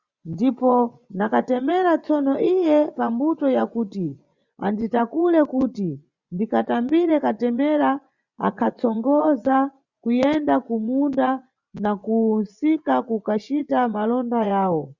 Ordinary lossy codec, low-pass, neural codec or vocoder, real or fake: Opus, 64 kbps; 7.2 kHz; vocoder, 24 kHz, 100 mel bands, Vocos; fake